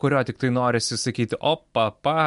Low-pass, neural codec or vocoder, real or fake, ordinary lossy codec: 10.8 kHz; none; real; MP3, 64 kbps